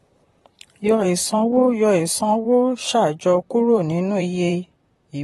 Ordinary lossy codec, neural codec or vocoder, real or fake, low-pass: AAC, 32 kbps; vocoder, 44.1 kHz, 128 mel bands, Pupu-Vocoder; fake; 19.8 kHz